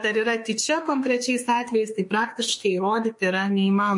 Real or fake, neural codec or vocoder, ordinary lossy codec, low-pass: fake; autoencoder, 48 kHz, 32 numbers a frame, DAC-VAE, trained on Japanese speech; MP3, 48 kbps; 10.8 kHz